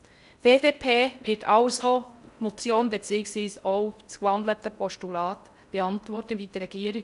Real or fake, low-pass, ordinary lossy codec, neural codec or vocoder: fake; 10.8 kHz; none; codec, 16 kHz in and 24 kHz out, 0.6 kbps, FocalCodec, streaming, 4096 codes